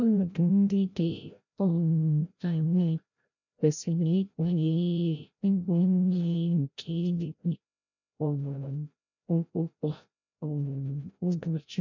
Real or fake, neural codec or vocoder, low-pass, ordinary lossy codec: fake; codec, 16 kHz, 0.5 kbps, FreqCodec, larger model; 7.2 kHz; none